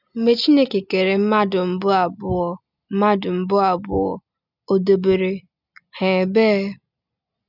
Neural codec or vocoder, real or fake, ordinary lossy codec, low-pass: none; real; none; 5.4 kHz